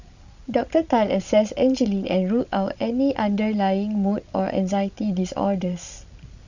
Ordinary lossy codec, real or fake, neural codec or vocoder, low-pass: none; fake; codec, 16 kHz, 16 kbps, FreqCodec, larger model; 7.2 kHz